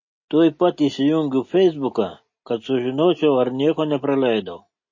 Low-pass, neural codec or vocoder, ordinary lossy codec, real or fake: 7.2 kHz; none; MP3, 32 kbps; real